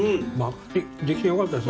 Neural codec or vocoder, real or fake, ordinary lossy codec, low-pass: none; real; none; none